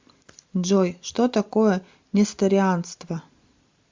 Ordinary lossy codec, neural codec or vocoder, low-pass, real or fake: MP3, 64 kbps; none; 7.2 kHz; real